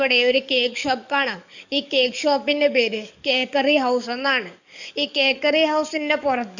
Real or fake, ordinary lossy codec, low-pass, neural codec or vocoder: fake; none; 7.2 kHz; codec, 44.1 kHz, 7.8 kbps, DAC